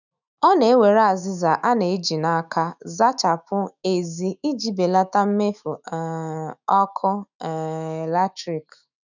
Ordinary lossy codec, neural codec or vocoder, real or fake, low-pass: none; autoencoder, 48 kHz, 128 numbers a frame, DAC-VAE, trained on Japanese speech; fake; 7.2 kHz